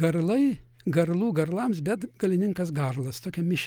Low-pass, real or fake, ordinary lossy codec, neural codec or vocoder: 19.8 kHz; real; Opus, 32 kbps; none